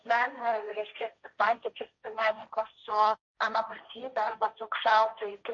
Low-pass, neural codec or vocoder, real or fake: 7.2 kHz; codec, 16 kHz, 1.1 kbps, Voila-Tokenizer; fake